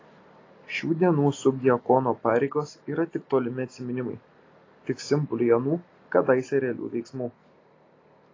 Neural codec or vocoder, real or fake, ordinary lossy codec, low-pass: none; real; AAC, 32 kbps; 7.2 kHz